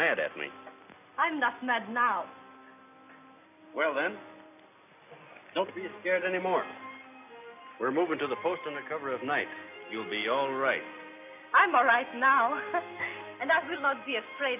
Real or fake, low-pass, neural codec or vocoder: real; 3.6 kHz; none